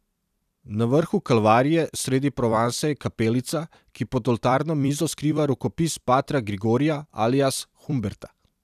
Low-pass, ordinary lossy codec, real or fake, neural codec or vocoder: 14.4 kHz; none; fake; vocoder, 44.1 kHz, 128 mel bands every 256 samples, BigVGAN v2